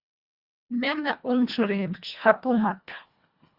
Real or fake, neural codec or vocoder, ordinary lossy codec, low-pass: fake; codec, 24 kHz, 1.5 kbps, HILCodec; Opus, 64 kbps; 5.4 kHz